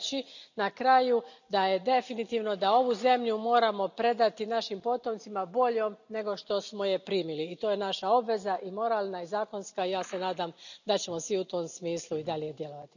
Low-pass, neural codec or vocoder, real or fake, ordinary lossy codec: 7.2 kHz; none; real; none